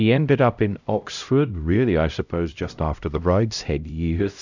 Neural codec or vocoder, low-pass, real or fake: codec, 16 kHz, 0.5 kbps, X-Codec, HuBERT features, trained on LibriSpeech; 7.2 kHz; fake